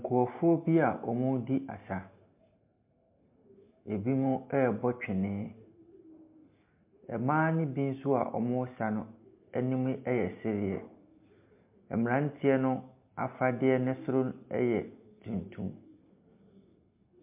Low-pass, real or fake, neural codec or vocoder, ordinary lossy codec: 3.6 kHz; real; none; MP3, 32 kbps